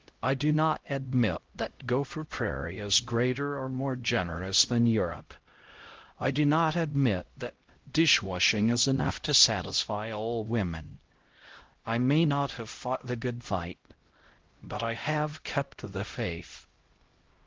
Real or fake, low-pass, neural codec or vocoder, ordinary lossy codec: fake; 7.2 kHz; codec, 16 kHz, 0.5 kbps, X-Codec, HuBERT features, trained on LibriSpeech; Opus, 16 kbps